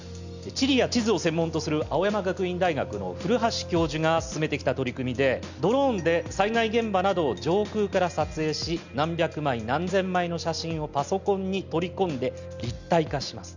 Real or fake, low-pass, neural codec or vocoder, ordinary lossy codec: real; 7.2 kHz; none; none